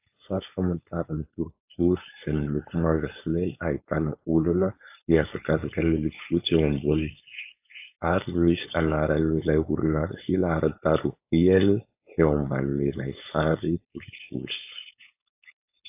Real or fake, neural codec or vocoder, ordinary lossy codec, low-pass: fake; codec, 16 kHz, 4.8 kbps, FACodec; AAC, 32 kbps; 3.6 kHz